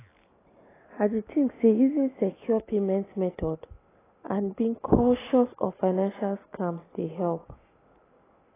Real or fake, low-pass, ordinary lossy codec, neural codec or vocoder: real; 3.6 kHz; AAC, 16 kbps; none